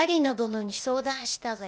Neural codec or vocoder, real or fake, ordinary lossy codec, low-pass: codec, 16 kHz, 0.8 kbps, ZipCodec; fake; none; none